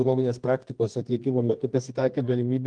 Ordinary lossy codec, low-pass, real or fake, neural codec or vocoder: Opus, 24 kbps; 9.9 kHz; fake; codec, 24 kHz, 0.9 kbps, WavTokenizer, medium music audio release